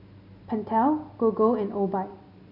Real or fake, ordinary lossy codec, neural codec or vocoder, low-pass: real; none; none; 5.4 kHz